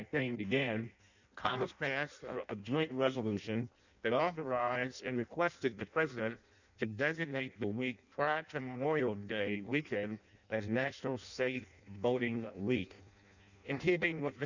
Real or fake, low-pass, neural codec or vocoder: fake; 7.2 kHz; codec, 16 kHz in and 24 kHz out, 0.6 kbps, FireRedTTS-2 codec